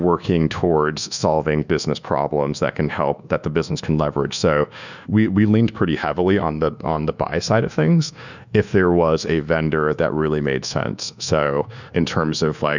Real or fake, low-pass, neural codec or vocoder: fake; 7.2 kHz; codec, 24 kHz, 1.2 kbps, DualCodec